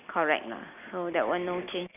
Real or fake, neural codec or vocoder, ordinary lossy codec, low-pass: real; none; none; 3.6 kHz